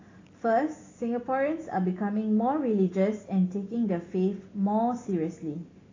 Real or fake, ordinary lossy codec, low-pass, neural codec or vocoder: real; AAC, 32 kbps; 7.2 kHz; none